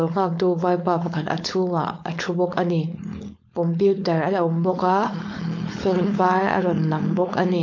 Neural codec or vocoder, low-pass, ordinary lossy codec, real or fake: codec, 16 kHz, 4.8 kbps, FACodec; 7.2 kHz; MP3, 48 kbps; fake